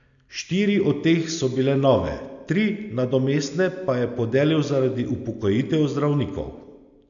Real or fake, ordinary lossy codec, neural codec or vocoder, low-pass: real; none; none; 7.2 kHz